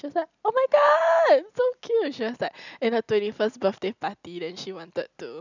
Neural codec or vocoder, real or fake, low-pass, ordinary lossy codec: none; real; 7.2 kHz; none